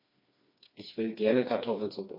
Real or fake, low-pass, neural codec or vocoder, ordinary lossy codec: fake; 5.4 kHz; codec, 16 kHz, 2 kbps, FreqCodec, smaller model; MP3, 32 kbps